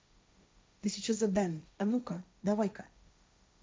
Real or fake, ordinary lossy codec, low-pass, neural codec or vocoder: fake; none; none; codec, 16 kHz, 1.1 kbps, Voila-Tokenizer